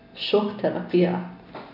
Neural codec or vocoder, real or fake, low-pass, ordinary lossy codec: none; real; 5.4 kHz; none